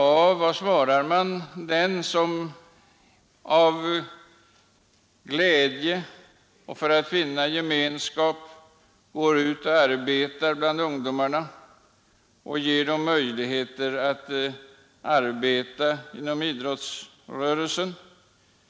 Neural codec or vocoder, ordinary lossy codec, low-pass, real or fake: none; none; none; real